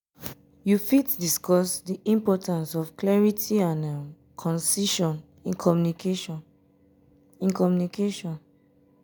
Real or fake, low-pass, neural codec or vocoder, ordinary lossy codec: real; none; none; none